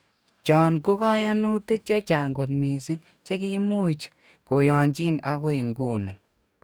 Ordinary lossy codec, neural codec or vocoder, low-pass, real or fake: none; codec, 44.1 kHz, 2.6 kbps, DAC; none; fake